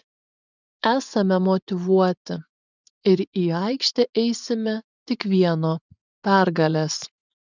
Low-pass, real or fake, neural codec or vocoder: 7.2 kHz; fake; vocoder, 24 kHz, 100 mel bands, Vocos